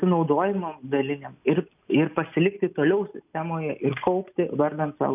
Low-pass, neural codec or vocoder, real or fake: 3.6 kHz; none; real